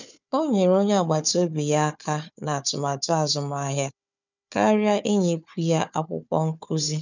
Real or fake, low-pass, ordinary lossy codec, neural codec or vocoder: fake; 7.2 kHz; none; codec, 16 kHz, 4 kbps, FunCodec, trained on Chinese and English, 50 frames a second